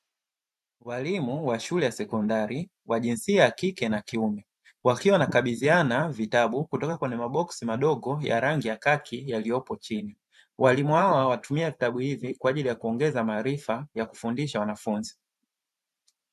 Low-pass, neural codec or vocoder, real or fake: 14.4 kHz; vocoder, 44.1 kHz, 128 mel bands every 512 samples, BigVGAN v2; fake